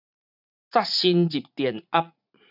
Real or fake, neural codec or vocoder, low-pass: fake; vocoder, 44.1 kHz, 80 mel bands, Vocos; 5.4 kHz